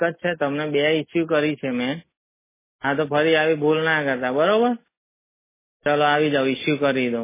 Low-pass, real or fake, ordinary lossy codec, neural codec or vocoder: 3.6 kHz; real; MP3, 16 kbps; none